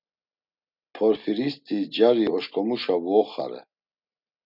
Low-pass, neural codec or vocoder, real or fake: 5.4 kHz; none; real